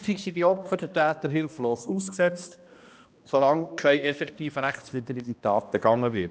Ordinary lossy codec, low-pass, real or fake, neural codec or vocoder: none; none; fake; codec, 16 kHz, 1 kbps, X-Codec, HuBERT features, trained on balanced general audio